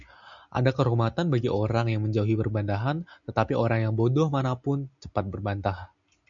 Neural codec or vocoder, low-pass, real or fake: none; 7.2 kHz; real